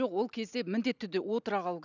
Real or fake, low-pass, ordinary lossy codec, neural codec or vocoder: real; 7.2 kHz; none; none